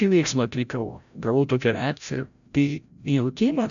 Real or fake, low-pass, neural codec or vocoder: fake; 7.2 kHz; codec, 16 kHz, 0.5 kbps, FreqCodec, larger model